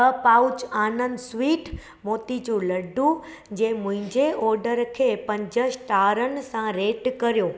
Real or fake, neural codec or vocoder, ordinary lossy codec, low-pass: real; none; none; none